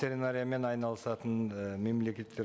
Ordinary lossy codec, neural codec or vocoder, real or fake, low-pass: none; none; real; none